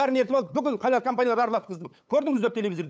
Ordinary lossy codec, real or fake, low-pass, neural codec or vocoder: none; fake; none; codec, 16 kHz, 8 kbps, FunCodec, trained on LibriTTS, 25 frames a second